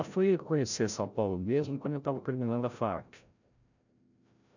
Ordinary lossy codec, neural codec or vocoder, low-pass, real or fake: none; codec, 16 kHz, 0.5 kbps, FreqCodec, larger model; 7.2 kHz; fake